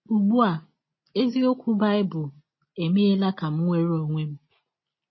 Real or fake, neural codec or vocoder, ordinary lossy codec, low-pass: real; none; MP3, 24 kbps; 7.2 kHz